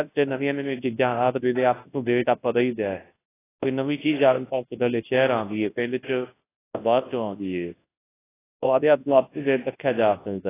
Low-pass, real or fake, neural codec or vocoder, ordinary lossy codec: 3.6 kHz; fake; codec, 24 kHz, 0.9 kbps, WavTokenizer, large speech release; AAC, 16 kbps